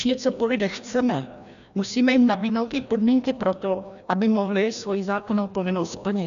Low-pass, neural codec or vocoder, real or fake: 7.2 kHz; codec, 16 kHz, 1 kbps, FreqCodec, larger model; fake